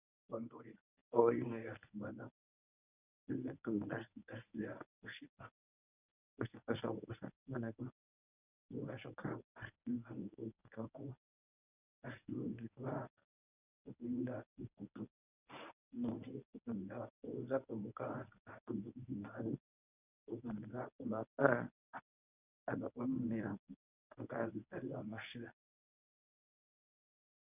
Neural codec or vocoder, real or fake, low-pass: codec, 24 kHz, 0.9 kbps, WavTokenizer, medium speech release version 1; fake; 3.6 kHz